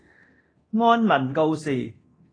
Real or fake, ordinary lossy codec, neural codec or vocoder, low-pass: fake; AAC, 32 kbps; codec, 24 kHz, 0.9 kbps, DualCodec; 9.9 kHz